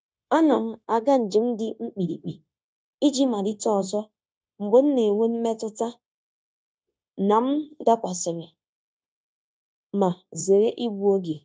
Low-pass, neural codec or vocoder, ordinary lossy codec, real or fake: none; codec, 16 kHz, 0.9 kbps, LongCat-Audio-Codec; none; fake